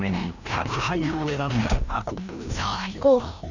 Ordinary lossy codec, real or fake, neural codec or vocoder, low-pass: none; fake; codec, 16 kHz, 1 kbps, FreqCodec, larger model; 7.2 kHz